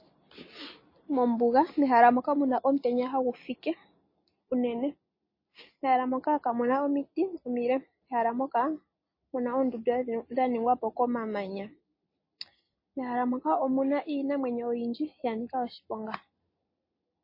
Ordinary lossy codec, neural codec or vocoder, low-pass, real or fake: MP3, 24 kbps; none; 5.4 kHz; real